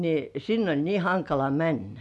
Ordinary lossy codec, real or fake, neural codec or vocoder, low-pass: none; real; none; none